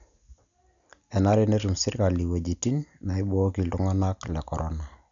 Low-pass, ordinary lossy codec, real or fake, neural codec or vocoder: 7.2 kHz; none; real; none